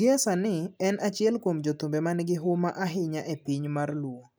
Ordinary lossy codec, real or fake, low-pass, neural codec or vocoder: none; real; none; none